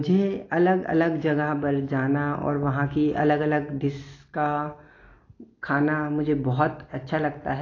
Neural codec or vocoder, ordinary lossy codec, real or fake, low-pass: none; AAC, 32 kbps; real; 7.2 kHz